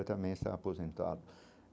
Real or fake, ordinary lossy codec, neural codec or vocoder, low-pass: real; none; none; none